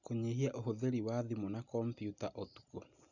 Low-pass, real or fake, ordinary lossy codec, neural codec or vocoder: 7.2 kHz; real; none; none